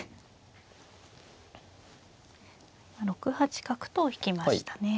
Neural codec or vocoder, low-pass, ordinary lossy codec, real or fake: none; none; none; real